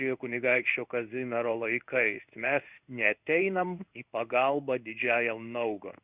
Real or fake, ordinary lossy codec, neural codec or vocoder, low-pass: fake; Opus, 64 kbps; codec, 16 kHz in and 24 kHz out, 1 kbps, XY-Tokenizer; 3.6 kHz